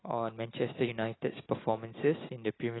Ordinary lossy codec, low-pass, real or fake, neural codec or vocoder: AAC, 16 kbps; 7.2 kHz; real; none